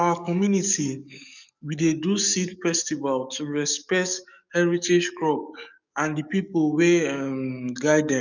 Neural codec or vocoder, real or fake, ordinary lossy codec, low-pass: codec, 44.1 kHz, 7.8 kbps, DAC; fake; none; 7.2 kHz